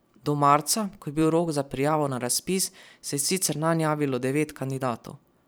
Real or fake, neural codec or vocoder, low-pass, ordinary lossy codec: fake; vocoder, 44.1 kHz, 128 mel bands every 512 samples, BigVGAN v2; none; none